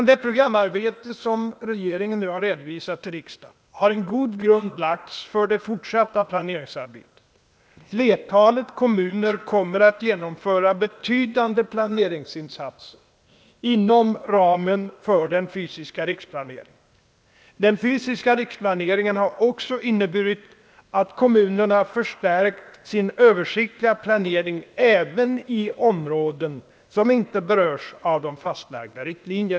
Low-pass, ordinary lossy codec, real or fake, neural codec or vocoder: none; none; fake; codec, 16 kHz, 0.8 kbps, ZipCodec